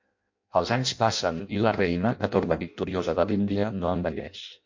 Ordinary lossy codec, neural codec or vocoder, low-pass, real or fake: MP3, 48 kbps; codec, 16 kHz in and 24 kHz out, 0.6 kbps, FireRedTTS-2 codec; 7.2 kHz; fake